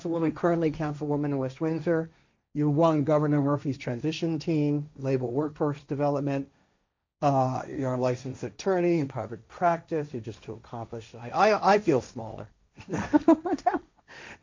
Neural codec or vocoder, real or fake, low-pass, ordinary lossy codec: codec, 16 kHz, 1.1 kbps, Voila-Tokenizer; fake; 7.2 kHz; MP3, 64 kbps